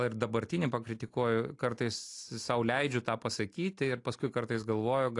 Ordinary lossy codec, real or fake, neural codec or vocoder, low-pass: AAC, 48 kbps; real; none; 10.8 kHz